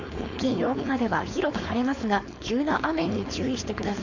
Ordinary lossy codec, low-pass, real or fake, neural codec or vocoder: none; 7.2 kHz; fake; codec, 16 kHz, 4.8 kbps, FACodec